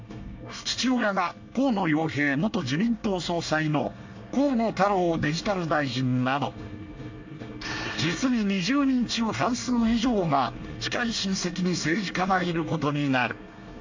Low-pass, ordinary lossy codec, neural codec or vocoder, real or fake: 7.2 kHz; AAC, 48 kbps; codec, 24 kHz, 1 kbps, SNAC; fake